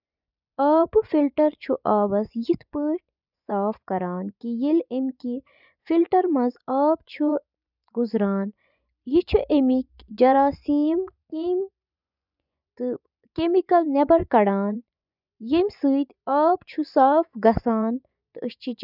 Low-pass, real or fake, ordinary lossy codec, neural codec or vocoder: 5.4 kHz; fake; none; vocoder, 24 kHz, 100 mel bands, Vocos